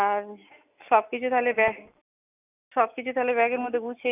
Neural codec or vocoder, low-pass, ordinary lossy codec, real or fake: none; 3.6 kHz; none; real